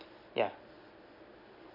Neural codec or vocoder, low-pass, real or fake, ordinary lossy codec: none; 5.4 kHz; real; none